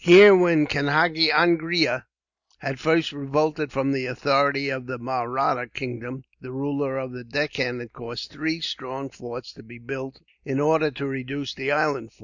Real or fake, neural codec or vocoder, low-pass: real; none; 7.2 kHz